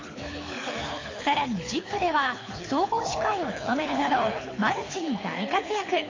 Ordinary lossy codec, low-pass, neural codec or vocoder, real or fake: AAC, 32 kbps; 7.2 kHz; codec, 24 kHz, 6 kbps, HILCodec; fake